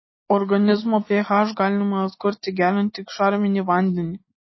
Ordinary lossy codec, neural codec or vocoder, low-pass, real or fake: MP3, 24 kbps; none; 7.2 kHz; real